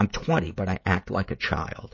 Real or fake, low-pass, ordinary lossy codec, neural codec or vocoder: fake; 7.2 kHz; MP3, 32 kbps; codec, 16 kHz, 16 kbps, FreqCodec, smaller model